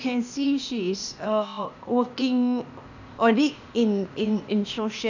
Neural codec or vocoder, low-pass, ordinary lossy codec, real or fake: codec, 16 kHz, 0.8 kbps, ZipCodec; 7.2 kHz; none; fake